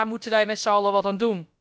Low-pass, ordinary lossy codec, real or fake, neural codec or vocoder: none; none; fake; codec, 16 kHz, about 1 kbps, DyCAST, with the encoder's durations